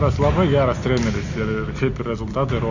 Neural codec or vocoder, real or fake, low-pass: none; real; 7.2 kHz